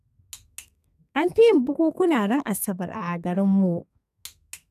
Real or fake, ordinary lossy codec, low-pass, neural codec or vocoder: fake; none; 14.4 kHz; codec, 32 kHz, 1.9 kbps, SNAC